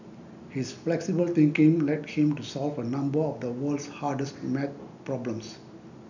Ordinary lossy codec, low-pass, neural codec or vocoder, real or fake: none; 7.2 kHz; none; real